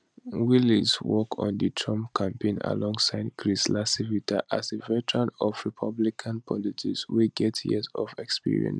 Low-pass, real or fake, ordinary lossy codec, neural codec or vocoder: 9.9 kHz; real; none; none